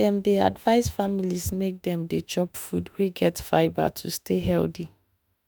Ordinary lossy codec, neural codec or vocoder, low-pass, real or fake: none; autoencoder, 48 kHz, 32 numbers a frame, DAC-VAE, trained on Japanese speech; none; fake